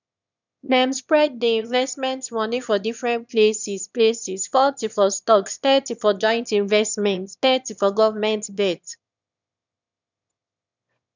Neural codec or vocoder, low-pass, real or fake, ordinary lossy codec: autoencoder, 22.05 kHz, a latent of 192 numbers a frame, VITS, trained on one speaker; 7.2 kHz; fake; none